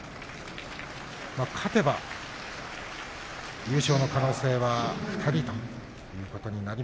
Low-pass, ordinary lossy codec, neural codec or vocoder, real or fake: none; none; none; real